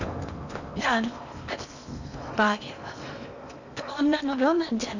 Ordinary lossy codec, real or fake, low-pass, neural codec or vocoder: none; fake; 7.2 kHz; codec, 16 kHz in and 24 kHz out, 0.8 kbps, FocalCodec, streaming, 65536 codes